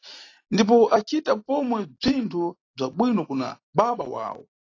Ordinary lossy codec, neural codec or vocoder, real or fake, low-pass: AAC, 32 kbps; none; real; 7.2 kHz